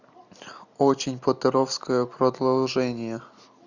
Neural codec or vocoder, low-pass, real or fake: none; 7.2 kHz; real